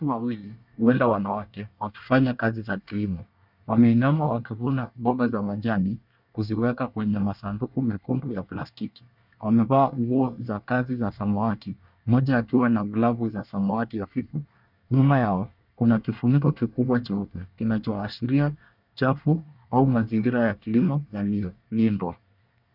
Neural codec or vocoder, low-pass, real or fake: codec, 24 kHz, 1 kbps, SNAC; 5.4 kHz; fake